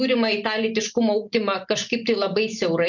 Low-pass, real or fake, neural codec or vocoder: 7.2 kHz; real; none